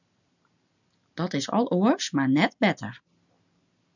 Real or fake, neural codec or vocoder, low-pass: real; none; 7.2 kHz